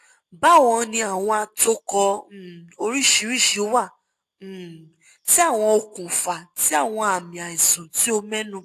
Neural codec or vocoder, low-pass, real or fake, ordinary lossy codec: none; 14.4 kHz; real; AAC, 64 kbps